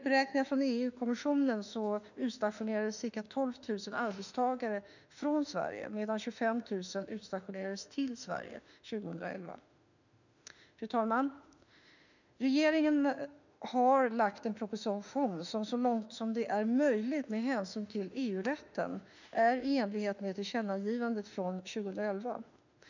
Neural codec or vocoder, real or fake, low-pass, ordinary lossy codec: autoencoder, 48 kHz, 32 numbers a frame, DAC-VAE, trained on Japanese speech; fake; 7.2 kHz; none